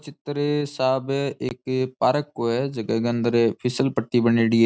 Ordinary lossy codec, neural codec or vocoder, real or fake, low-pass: none; none; real; none